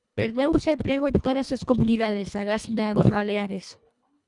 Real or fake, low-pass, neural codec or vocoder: fake; 10.8 kHz; codec, 24 kHz, 1.5 kbps, HILCodec